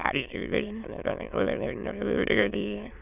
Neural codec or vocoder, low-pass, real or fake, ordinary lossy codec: autoencoder, 22.05 kHz, a latent of 192 numbers a frame, VITS, trained on many speakers; 3.6 kHz; fake; none